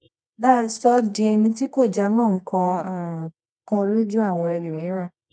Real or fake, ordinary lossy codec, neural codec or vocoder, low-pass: fake; none; codec, 24 kHz, 0.9 kbps, WavTokenizer, medium music audio release; 9.9 kHz